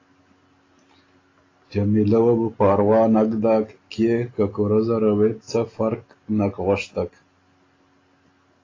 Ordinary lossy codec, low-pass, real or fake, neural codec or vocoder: AAC, 32 kbps; 7.2 kHz; real; none